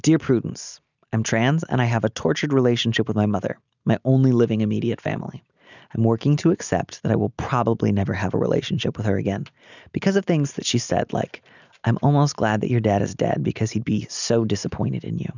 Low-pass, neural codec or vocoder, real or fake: 7.2 kHz; none; real